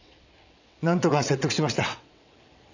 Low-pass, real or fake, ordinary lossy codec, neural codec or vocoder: 7.2 kHz; fake; none; vocoder, 22.05 kHz, 80 mel bands, WaveNeXt